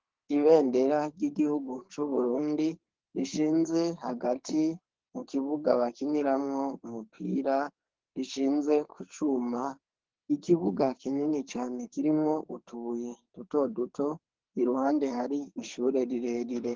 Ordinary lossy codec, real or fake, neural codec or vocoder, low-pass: Opus, 16 kbps; fake; codec, 44.1 kHz, 2.6 kbps, SNAC; 7.2 kHz